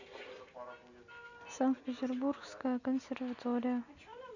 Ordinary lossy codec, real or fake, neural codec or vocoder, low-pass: none; real; none; 7.2 kHz